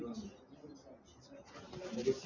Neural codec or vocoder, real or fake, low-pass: none; real; 7.2 kHz